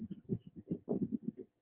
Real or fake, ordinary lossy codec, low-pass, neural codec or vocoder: real; Opus, 24 kbps; 3.6 kHz; none